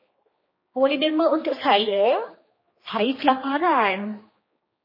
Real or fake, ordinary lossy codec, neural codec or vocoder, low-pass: fake; MP3, 24 kbps; codec, 16 kHz, 2 kbps, X-Codec, HuBERT features, trained on general audio; 5.4 kHz